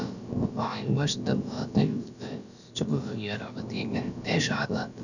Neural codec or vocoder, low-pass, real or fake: codec, 16 kHz, about 1 kbps, DyCAST, with the encoder's durations; 7.2 kHz; fake